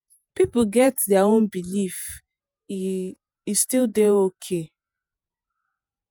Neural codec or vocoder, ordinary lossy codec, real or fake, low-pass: vocoder, 48 kHz, 128 mel bands, Vocos; none; fake; none